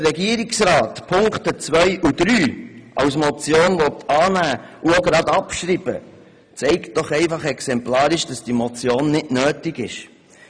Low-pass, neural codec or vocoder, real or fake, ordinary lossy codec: 9.9 kHz; none; real; none